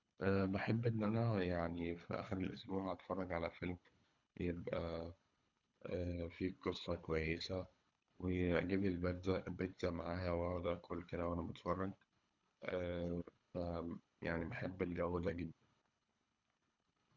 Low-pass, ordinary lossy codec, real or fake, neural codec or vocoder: 7.2 kHz; none; fake; codec, 24 kHz, 3 kbps, HILCodec